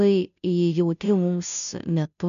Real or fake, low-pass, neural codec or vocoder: fake; 7.2 kHz; codec, 16 kHz, 0.5 kbps, FunCodec, trained on Chinese and English, 25 frames a second